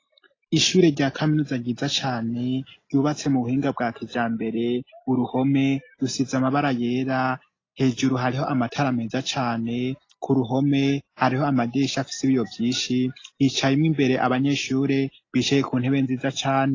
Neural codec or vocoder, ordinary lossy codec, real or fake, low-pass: none; AAC, 32 kbps; real; 7.2 kHz